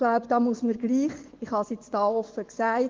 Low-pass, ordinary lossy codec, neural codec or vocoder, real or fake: 7.2 kHz; Opus, 16 kbps; vocoder, 24 kHz, 100 mel bands, Vocos; fake